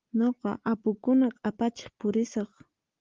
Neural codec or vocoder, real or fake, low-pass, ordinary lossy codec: none; real; 7.2 kHz; Opus, 32 kbps